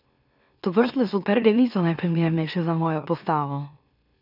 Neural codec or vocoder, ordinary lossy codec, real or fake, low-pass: autoencoder, 44.1 kHz, a latent of 192 numbers a frame, MeloTTS; none; fake; 5.4 kHz